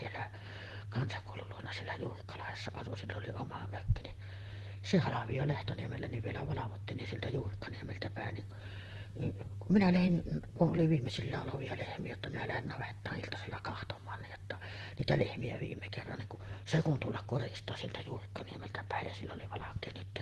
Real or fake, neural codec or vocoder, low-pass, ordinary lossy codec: fake; vocoder, 44.1 kHz, 128 mel bands, Pupu-Vocoder; 14.4 kHz; Opus, 16 kbps